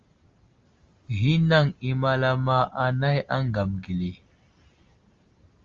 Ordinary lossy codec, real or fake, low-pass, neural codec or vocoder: Opus, 32 kbps; real; 7.2 kHz; none